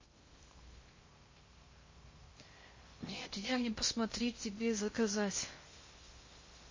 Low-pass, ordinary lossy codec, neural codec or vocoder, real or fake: 7.2 kHz; MP3, 32 kbps; codec, 16 kHz in and 24 kHz out, 0.6 kbps, FocalCodec, streaming, 2048 codes; fake